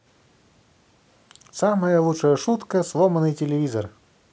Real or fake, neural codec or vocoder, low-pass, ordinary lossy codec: real; none; none; none